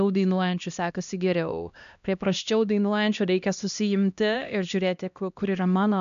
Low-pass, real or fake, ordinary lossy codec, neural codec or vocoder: 7.2 kHz; fake; AAC, 96 kbps; codec, 16 kHz, 1 kbps, X-Codec, HuBERT features, trained on LibriSpeech